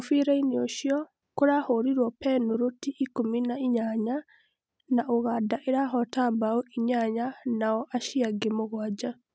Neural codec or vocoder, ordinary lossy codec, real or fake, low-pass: none; none; real; none